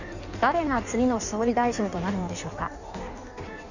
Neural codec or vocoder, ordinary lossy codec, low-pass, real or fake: codec, 16 kHz in and 24 kHz out, 1.1 kbps, FireRedTTS-2 codec; none; 7.2 kHz; fake